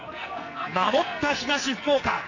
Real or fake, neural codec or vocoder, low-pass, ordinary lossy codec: fake; codec, 44.1 kHz, 2.6 kbps, SNAC; 7.2 kHz; none